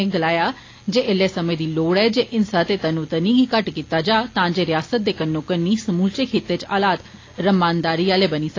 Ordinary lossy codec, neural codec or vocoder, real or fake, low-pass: AAC, 32 kbps; none; real; 7.2 kHz